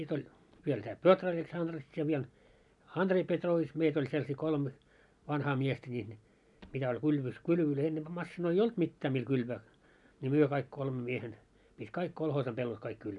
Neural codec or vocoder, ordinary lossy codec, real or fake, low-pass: none; none; real; 10.8 kHz